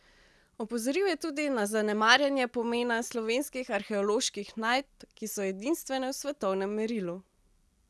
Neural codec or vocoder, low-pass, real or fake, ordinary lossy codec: none; none; real; none